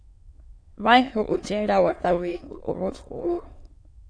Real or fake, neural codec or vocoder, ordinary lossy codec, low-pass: fake; autoencoder, 22.05 kHz, a latent of 192 numbers a frame, VITS, trained on many speakers; AAC, 48 kbps; 9.9 kHz